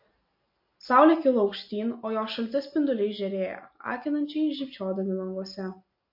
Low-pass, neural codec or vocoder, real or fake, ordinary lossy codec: 5.4 kHz; none; real; MP3, 32 kbps